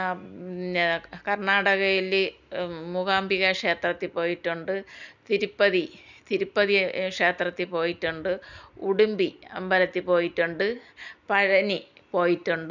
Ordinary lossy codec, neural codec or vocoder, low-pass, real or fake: none; none; 7.2 kHz; real